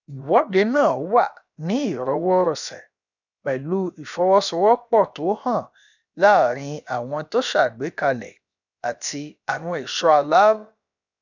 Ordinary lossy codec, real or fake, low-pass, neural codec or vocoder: none; fake; 7.2 kHz; codec, 16 kHz, about 1 kbps, DyCAST, with the encoder's durations